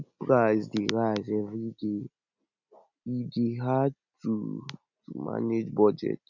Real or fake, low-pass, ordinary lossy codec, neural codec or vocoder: real; 7.2 kHz; none; none